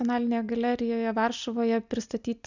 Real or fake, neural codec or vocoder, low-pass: real; none; 7.2 kHz